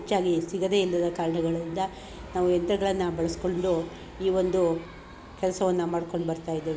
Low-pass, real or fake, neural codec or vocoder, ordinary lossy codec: none; real; none; none